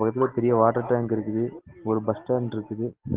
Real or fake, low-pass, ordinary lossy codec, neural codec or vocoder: real; 3.6 kHz; Opus, 16 kbps; none